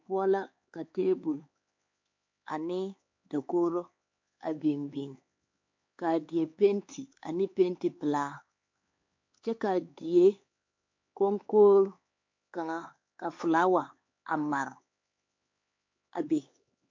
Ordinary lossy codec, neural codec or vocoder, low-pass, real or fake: MP3, 48 kbps; codec, 16 kHz, 4 kbps, X-Codec, HuBERT features, trained on LibriSpeech; 7.2 kHz; fake